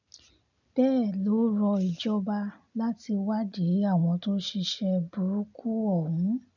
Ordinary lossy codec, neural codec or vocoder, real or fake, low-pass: none; none; real; 7.2 kHz